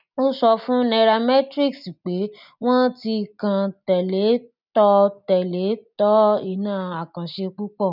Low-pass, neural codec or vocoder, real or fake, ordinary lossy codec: 5.4 kHz; none; real; none